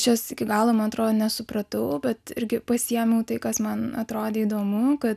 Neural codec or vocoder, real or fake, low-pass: none; real; 14.4 kHz